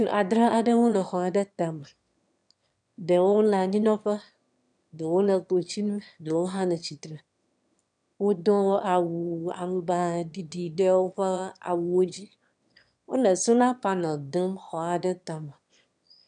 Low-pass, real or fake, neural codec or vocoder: 9.9 kHz; fake; autoencoder, 22.05 kHz, a latent of 192 numbers a frame, VITS, trained on one speaker